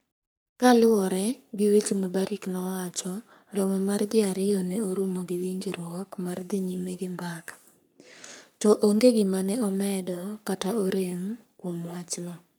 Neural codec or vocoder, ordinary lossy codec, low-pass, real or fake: codec, 44.1 kHz, 3.4 kbps, Pupu-Codec; none; none; fake